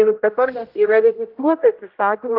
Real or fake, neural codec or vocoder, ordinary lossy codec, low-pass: fake; codec, 16 kHz, 0.5 kbps, X-Codec, HuBERT features, trained on general audio; Opus, 24 kbps; 5.4 kHz